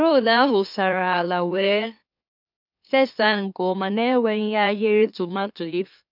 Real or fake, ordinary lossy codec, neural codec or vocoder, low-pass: fake; none; autoencoder, 44.1 kHz, a latent of 192 numbers a frame, MeloTTS; 5.4 kHz